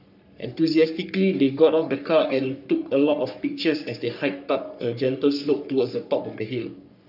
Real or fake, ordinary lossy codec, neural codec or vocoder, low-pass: fake; none; codec, 44.1 kHz, 3.4 kbps, Pupu-Codec; 5.4 kHz